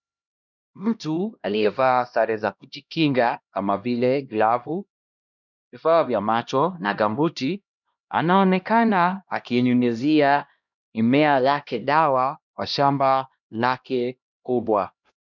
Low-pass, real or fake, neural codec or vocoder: 7.2 kHz; fake; codec, 16 kHz, 1 kbps, X-Codec, HuBERT features, trained on LibriSpeech